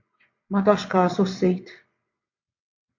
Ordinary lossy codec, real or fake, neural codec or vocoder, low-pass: AAC, 48 kbps; fake; vocoder, 22.05 kHz, 80 mel bands, WaveNeXt; 7.2 kHz